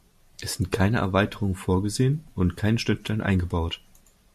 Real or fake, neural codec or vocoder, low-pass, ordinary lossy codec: real; none; 14.4 kHz; MP3, 64 kbps